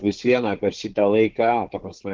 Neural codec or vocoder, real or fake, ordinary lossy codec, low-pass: codec, 16 kHz, 4 kbps, FunCodec, trained on Chinese and English, 50 frames a second; fake; Opus, 16 kbps; 7.2 kHz